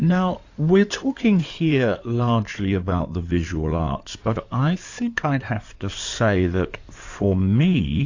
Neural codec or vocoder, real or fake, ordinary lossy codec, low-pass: codec, 16 kHz in and 24 kHz out, 2.2 kbps, FireRedTTS-2 codec; fake; AAC, 48 kbps; 7.2 kHz